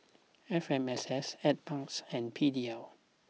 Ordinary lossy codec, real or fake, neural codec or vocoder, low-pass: none; real; none; none